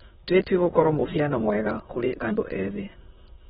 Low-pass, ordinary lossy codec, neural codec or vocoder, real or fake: 9.9 kHz; AAC, 16 kbps; autoencoder, 22.05 kHz, a latent of 192 numbers a frame, VITS, trained on many speakers; fake